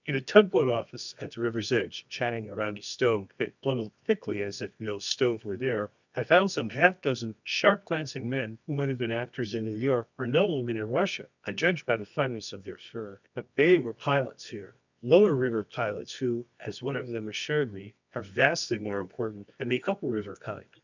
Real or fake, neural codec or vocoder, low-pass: fake; codec, 24 kHz, 0.9 kbps, WavTokenizer, medium music audio release; 7.2 kHz